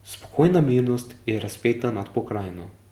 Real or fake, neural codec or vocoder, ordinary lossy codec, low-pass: real; none; Opus, 24 kbps; 19.8 kHz